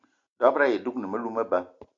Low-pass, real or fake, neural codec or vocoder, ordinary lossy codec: 7.2 kHz; real; none; AAC, 48 kbps